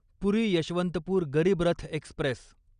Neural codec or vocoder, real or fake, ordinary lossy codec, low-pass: none; real; Opus, 64 kbps; 9.9 kHz